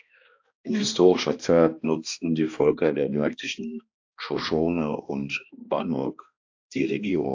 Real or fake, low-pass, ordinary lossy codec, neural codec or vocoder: fake; 7.2 kHz; AAC, 48 kbps; codec, 16 kHz, 1 kbps, X-Codec, HuBERT features, trained on balanced general audio